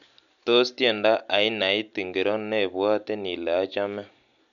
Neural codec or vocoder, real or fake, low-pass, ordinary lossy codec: none; real; 7.2 kHz; none